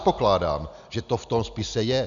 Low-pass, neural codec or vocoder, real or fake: 7.2 kHz; none; real